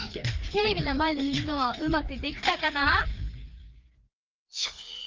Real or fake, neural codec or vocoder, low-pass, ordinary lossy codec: fake; codec, 16 kHz, 2 kbps, FreqCodec, larger model; 7.2 kHz; Opus, 32 kbps